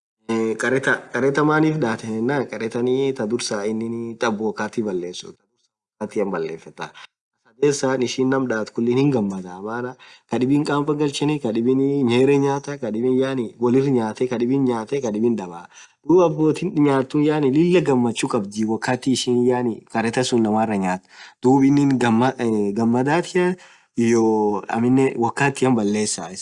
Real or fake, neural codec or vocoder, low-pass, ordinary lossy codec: real; none; 10.8 kHz; Opus, 64 kbps